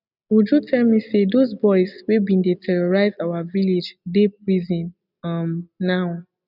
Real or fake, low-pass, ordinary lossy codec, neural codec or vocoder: real; 5.4 kHz; none; none